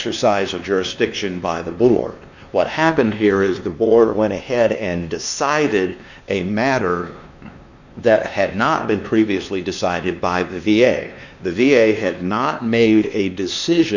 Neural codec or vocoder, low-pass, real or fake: codec, 16 kHz, 2 kbps, X-Codec, WavLM features, trained on Multilingual LibriSpeech; 7.2 kHz; fake